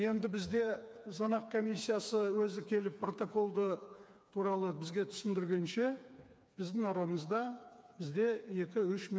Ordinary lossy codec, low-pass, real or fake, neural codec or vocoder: none; none; fake; codec, 16 kHz, 4 kbps, FreqCodec, smaller model